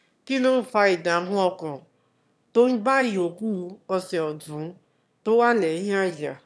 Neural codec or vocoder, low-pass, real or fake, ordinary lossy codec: autoencoder, 22.05 kHz, a latent of 192 numbers a frame, VITS, trained on one speaker; none; fake; none